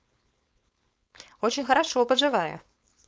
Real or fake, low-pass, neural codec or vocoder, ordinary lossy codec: fake; none; codec, 16 kHz, 4.8 kbps, FACodec; none